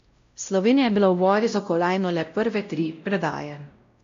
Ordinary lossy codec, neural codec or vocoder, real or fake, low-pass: AAC, 48 kbps; codec, 16 kHz, 0.5 kbps, X-Codec, WavLM features, trained on Multilingual LibriSpeech; fake; 7.2 kHz